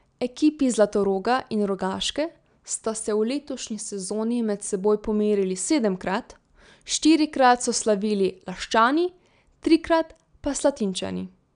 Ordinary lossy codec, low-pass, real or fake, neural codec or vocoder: none; 9.9 kHz; real; none